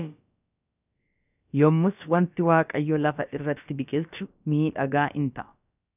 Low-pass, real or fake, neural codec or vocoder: 3.6 kHz; fake; codec, 16 kHz, about 1 kbps, DyCAST, with the encoder's durations